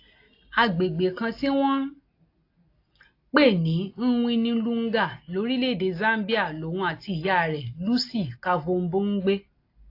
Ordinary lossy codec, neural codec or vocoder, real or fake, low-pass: AAC, 32 kbps; none; real; 5.4 kHz